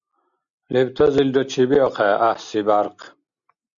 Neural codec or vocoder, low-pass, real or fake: none; 7.2 kHz; real